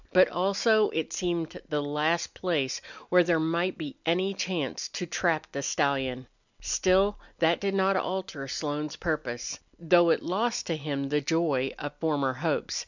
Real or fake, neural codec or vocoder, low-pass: real; none; 7.2 kHz